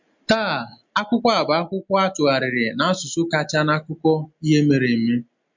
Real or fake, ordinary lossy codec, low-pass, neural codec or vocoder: real; MP3, 64 kbps; 7.2 kHz; none